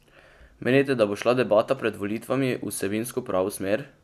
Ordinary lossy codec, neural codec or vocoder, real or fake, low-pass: none; none; real; 14.4 kHz